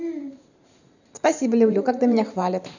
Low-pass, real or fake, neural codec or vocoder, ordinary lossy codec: 7.2 kHz; fake; vocoder, 44.1 kHz, 128 mel bands every 512 samples, BigVGAN v2; none